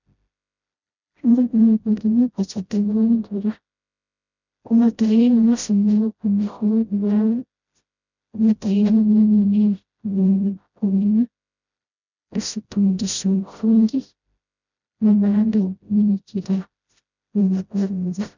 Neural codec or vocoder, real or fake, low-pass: codec, 16 kHz, 0.5 kbps, FreqCodec, smaller model; fake; 7.2 kHz